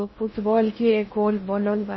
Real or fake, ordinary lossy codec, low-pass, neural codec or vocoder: fake; MP3, 24 kbps; 7.2 kHz; codec, 16 kHz in and 24 kHz out, 0.6 kbps, FocalCodec, streaming, 4096 codes